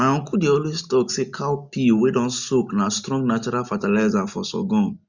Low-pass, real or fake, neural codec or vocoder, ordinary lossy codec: 7.2 kHz; real; none; none